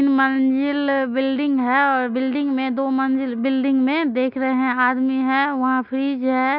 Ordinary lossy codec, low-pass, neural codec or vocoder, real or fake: none; 5.4 kHz; none; real